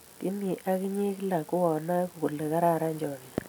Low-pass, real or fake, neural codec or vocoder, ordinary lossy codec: none; real; none; none